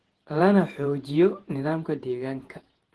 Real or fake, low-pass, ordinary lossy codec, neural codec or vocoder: fake; 10.8 kHz; Opus, 16 kbps; vocoder, 48 kHz, 128 mel bands, Vocos